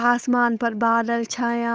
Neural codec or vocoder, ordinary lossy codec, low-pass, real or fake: codec, 16 kHz, 8 kbps, FunCodec, trained on Chinese and English, 25 frames a second; none; none; fake